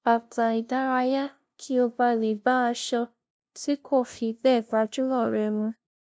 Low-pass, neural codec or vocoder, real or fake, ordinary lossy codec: none; codec, 16 kHz, 0.5 kbps, FunCodec, trained on LibriTTS, 25 frames a second; fake; none